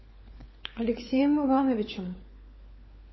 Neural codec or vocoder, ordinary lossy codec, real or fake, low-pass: codec, 16 kHz, 4 kbps, FunCodec, trained on LibriTTS, 50 frames a second; MP3, 24 kbps; fake; 7.2 kHz